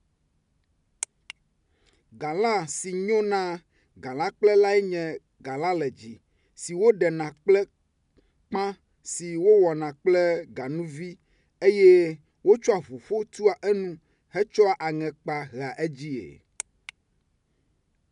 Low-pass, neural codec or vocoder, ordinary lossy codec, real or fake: 10.8 kHz; none; MP3, 96 kbps; real